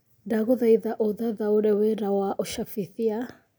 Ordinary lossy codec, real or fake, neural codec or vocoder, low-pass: none; real; none; none